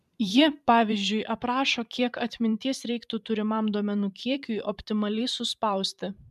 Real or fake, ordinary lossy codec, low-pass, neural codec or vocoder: fake; MP3, 96 kbps; 14.4 kHz; vocoder, 44.1 kHz, 128 mel bands every 512 samples, BigVGAN v2